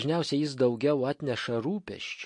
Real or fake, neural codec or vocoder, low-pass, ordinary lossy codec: real; none; 10.8 kHz; MP3, 48 kbps